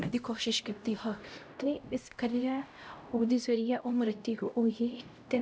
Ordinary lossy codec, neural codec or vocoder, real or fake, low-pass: none; codec, 16 kHz, 0.5 kbps, X-Codec, HuBERT features, trained on LibriSpeech; fake; none